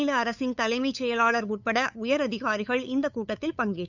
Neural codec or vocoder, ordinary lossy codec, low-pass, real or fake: codec, 16 kHz, 8 kbps, FunCodec, trained on LibriTTS, 25 frames a second; none; 7.2 kHz; fake